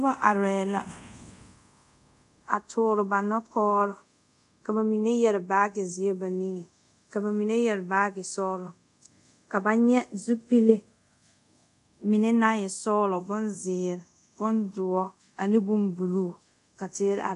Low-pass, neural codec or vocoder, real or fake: 10.8 kHz; codec, 24 kHz, 0.5 kbps, DualCodec; fake